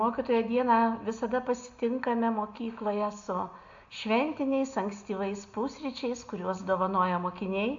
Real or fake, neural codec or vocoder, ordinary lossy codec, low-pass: real; none; AAC, 64 kbps; 7.2 kHz